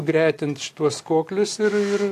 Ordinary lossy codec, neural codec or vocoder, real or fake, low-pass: AAC, 64 kbps; none; real; 14.4 kHz